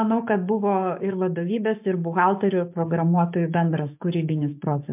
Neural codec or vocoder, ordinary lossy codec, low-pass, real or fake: codec, 16 kHz, 6 kbps, DAC; MP3, 32 kbps; 3.6 kHz; fake